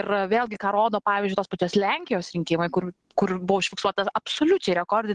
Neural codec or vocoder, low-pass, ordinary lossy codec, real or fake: none; 10.8 kHz; Opus, 16 kbps; real